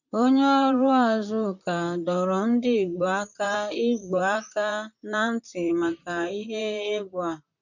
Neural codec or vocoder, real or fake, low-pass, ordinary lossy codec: vocoder, 44.1 kHz, 128 mel bands, Pupu-Vocoder; fake; 7.2 kHz; none